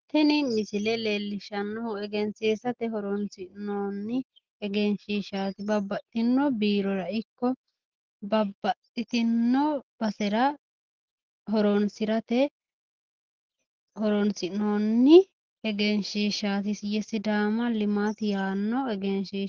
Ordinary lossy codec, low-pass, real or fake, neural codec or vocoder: Opus, 16 kbps; 7.2 kHz; real; none